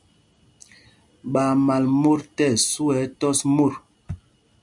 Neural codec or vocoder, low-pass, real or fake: none; 10.8 kHz; real